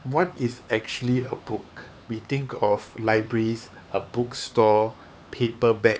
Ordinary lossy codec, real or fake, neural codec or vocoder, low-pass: none; fake; codec, 16 kHz, 4 kbps, X-Codec, HuBERT features, trained on LibriSpeech; none